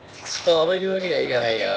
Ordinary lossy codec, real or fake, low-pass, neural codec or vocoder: none; fake; none; codec, 16 kHz, 0.8 kbps, ZipCodec